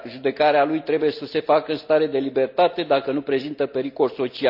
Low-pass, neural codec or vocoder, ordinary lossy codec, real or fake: 5.4 kHz; none; none; real